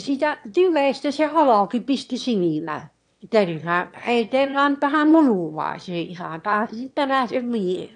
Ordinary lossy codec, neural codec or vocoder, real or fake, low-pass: AAC, 64 kbps; autoencoder, 22.05 kHz, a latent of 192 numbers a frame, VITS, trained on one speaker; fake; 9.9 kHz